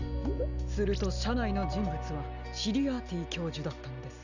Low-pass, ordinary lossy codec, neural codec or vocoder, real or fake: 7.2 kHz; none; none; real